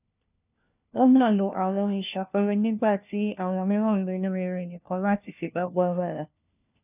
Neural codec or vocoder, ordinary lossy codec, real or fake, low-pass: codec, 16 kHz, 1 kbps, FunCodec, trained on LibriTTS, 50 frames a second; none; fake; 3.6 kHz